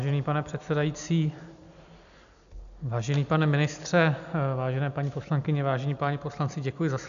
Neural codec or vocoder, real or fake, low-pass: none; real; 7.2 kHz